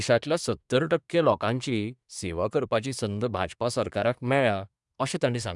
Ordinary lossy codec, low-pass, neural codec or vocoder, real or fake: none; 10.8 kHz; codec, 24 kHz, 1 kbps, SNAC; fake